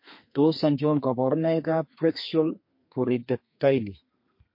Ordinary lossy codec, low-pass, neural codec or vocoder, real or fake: MP3, 32 kbps; 5.4 kHz; codec, 32 kHz, 1.9 kbps, SNAC; fake